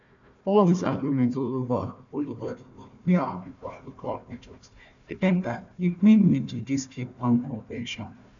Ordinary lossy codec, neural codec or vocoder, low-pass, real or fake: none; codec, 16 kHz, 1 kbps, FunCodec, trained on Chinese and English, 50 frames a second; 7.2 kHz; fake